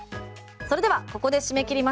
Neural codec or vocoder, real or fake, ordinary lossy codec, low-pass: none; real; none; none